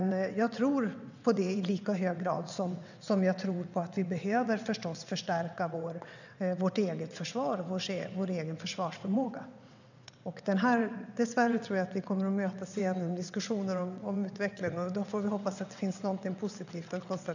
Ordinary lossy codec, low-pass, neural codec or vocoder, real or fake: none; 7.2 kHz; vocoder, 22.05 kHz, 80 mel bands, WaveNeXt; fake